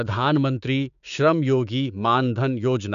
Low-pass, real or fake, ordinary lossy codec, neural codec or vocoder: 7.2 kHz; fake; none; codec, 16 kHz, 6 kbps, DAC